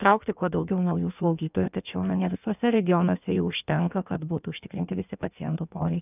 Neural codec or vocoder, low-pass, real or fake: codec, 16 kHz in and 24 kHz out, 1.1 kbps, FireRedTTS-2 codec; 3.6 kHz; fake